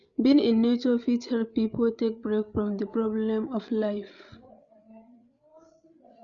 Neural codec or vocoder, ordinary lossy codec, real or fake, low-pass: codec, 16 kHz, 8 kbps, FreqCodec, larger model; none; fake; 7.2 kHz